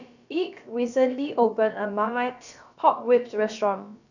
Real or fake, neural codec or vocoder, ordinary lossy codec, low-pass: fake; codec, 16 kHz, about 1 kbps, DyCAST, with the encoder's durations; none; 7.2 kHz